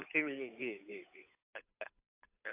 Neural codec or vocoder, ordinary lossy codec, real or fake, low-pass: codec, 16 kHz, 8 kbps, FunCodec, trained on Chinese and English, 25 frames a second; AAC, 24 kbps; fake; 3.6 kHz